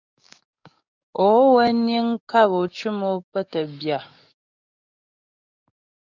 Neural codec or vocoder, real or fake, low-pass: codec, 44.1 kHz, 7.8 kbps, DAC; fake; 7.2 kHz